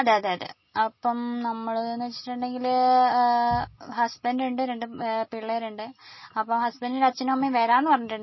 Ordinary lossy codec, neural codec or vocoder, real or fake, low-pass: MP3, 24 kbps; none; real; 7.2 kHz